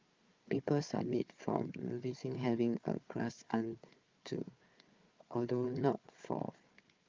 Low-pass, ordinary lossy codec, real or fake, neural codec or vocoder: 7.2 kHz; Opus, 24 kbps; fake; codec, 16 kHz, 4 kbps, FunCodec, trained on Chinese and English, 50 frames a second